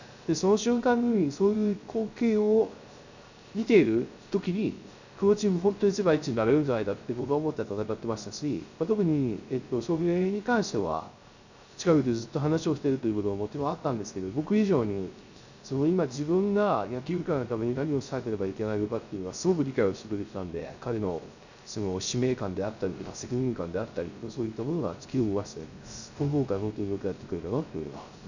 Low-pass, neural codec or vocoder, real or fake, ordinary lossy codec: 7.2 kHz; codec, 16 kHz, 0.3 kbps, FocalCodec; fake; none